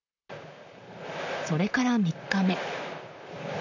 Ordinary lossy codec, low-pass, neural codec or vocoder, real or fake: none; 7.2 kHz; vocoder, 44.1 kHz, 128 mel bands, Pupu-Vocoder; fake